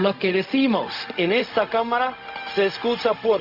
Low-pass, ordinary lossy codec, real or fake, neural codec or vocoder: 5.4 kHz; Opus, 64 kbps; fake; codec, 16 kHz, 0.4 kbps, LongCat-Audio-Codec